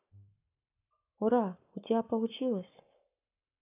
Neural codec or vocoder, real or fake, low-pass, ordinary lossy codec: codec, 44.1 kHz, 7.8 kbps, Pupu-Codec; fake; 3.6 kHz; none